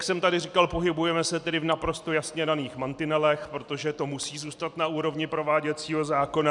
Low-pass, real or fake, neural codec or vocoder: 10.8 kHz; real; none